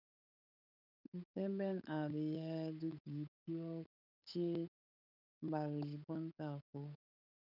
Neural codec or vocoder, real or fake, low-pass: codec, 16 kHz, 8 kbps, FunCodec, trained on Chinese and English, 25 frames a second; fake; 5.4 kHz